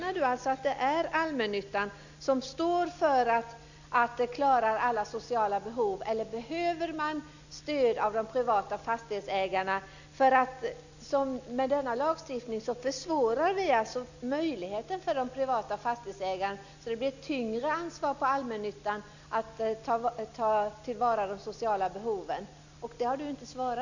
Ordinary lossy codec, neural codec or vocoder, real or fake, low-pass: none; none; real; 7.2 kHz